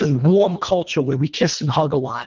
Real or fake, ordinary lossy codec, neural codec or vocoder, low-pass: fake; Opus, 32 kbps; codec, 24 kHz, 1.5 kbps, HILCodec; 7.2 kHz